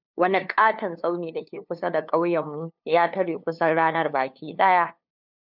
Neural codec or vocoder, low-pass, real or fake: codec, 16 kHz, 2 kbps, FunCodec, trained on LibriTTS, 25 frames a second; 5.4 kHz; fake